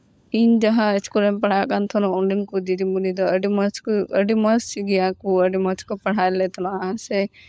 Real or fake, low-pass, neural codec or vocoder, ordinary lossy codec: fake; none; codec, 16 kHz, 16 kbps, FunCodec, trained on LibriTTS, 50 frames a second; none